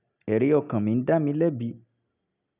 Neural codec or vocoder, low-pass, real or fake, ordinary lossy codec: none; 3.6 kHz; real; none